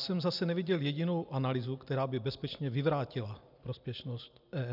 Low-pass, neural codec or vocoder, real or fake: 5.4 kHz; none; real